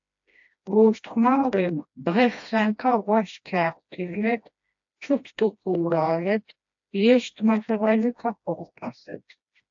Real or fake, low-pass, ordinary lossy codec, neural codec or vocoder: fake; 7.2 kHz; AAC, 64 kbps; codec, 16 kHz, 1 kbps, FreqCodec, smaller model